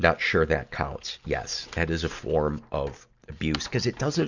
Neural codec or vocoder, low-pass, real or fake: none; 7.2 kHz; real